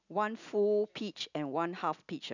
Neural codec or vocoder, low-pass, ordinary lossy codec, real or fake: none; 7.2 kHz; none; real